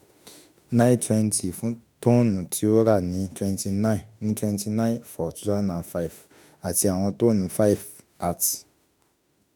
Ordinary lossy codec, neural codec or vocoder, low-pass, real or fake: none; autoencoder, 48 kHz, 32 numbers a frame, DAC-VAE, trained on Japanese speech; none; fake